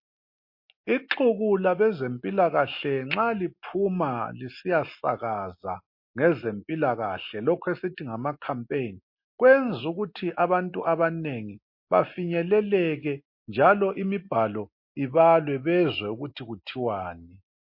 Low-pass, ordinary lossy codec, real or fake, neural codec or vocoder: 5.4 kHz; MP3, 32 kbps; real; none